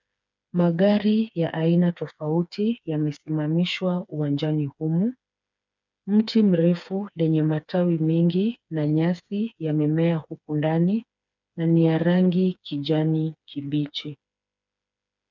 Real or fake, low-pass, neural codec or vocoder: fake; 7.2 kHz; codec, 16 kHz, 4 kbps, FreqCodec, smaller model